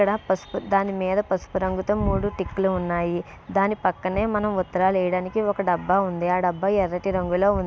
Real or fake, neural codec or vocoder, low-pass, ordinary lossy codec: real; none; none; none